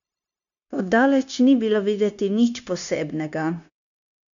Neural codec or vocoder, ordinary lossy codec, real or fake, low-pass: codec, 16 kHz, 0.9 kbps, LongCat-Audio-Codec; none; fake; 7.2 kHz